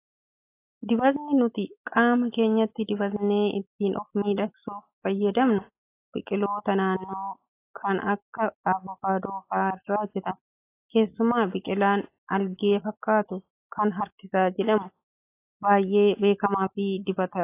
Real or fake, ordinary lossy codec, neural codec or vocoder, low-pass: real; AAC, 24 kbps; none; 3.6 kHz